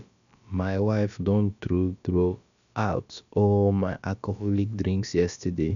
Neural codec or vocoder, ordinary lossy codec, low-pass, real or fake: codec, 16 kHz, about 1 kbps, DyCAST, with the encoder's durations; none; 7.2 kHz; fake